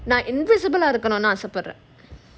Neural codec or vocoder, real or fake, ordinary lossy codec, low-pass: none; real; none; none